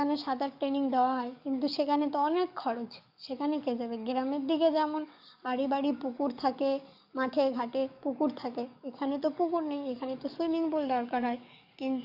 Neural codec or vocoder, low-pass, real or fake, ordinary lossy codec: codec, 44.1 kHz, 7.8 kbps, DAC; 5.4 kHz; fake; none